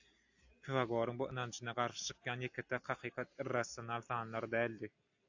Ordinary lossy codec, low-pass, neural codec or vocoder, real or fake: AAC, 48 kbps; 7.2 kHz; none; real